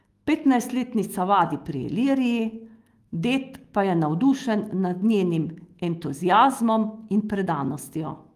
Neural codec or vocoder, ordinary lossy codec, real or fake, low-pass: autoencoder, 48 kHz, 128 numbers a frame, DAC-VAE, trained on Japanese speech; Opus, 24 kbps; fake; 14.4 kHz